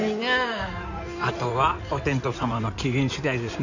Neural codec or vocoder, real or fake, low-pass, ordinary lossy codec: codec, 16 kHz in and 24 kHz out, 2.2 kbps, FireRedTTS-2 codec; fake; 7.2 kHz; none